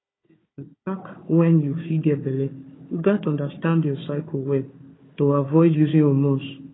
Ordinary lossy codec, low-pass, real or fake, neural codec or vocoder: AAC, 16 kbps; 7.2 kHz; fake; codec, 16 kHz, 4 kbps, FunCodec, trained on Chinese and English, 50 frames a second